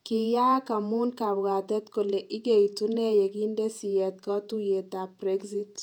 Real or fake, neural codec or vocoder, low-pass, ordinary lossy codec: fake; vocoder, 48 kHz, 128 mel bands, Vocos; 19.8 kHz; none